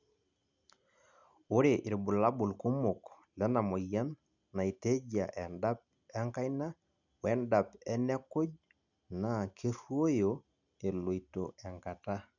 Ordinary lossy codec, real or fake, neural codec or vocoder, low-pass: none; real; none; 7.2 kHz